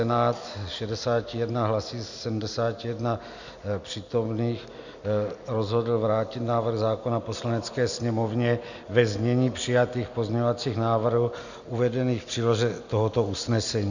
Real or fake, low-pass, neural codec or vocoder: real; 7.2 kHz; none